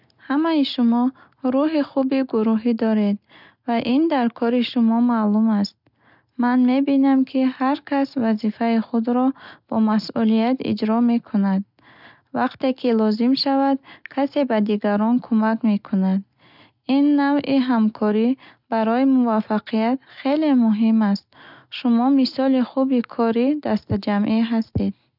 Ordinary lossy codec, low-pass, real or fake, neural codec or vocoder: none; 5.4 kHz; real; none